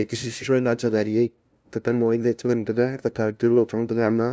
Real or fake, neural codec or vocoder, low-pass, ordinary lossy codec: fake; codec, 16 kHz, 0.5 kbps, FunCodec, trained on LibriTTS, 25 frames a second; none; none